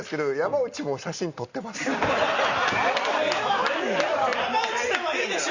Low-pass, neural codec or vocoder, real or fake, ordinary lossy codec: 7.2 kHz; none; real; Opus, 64 kbps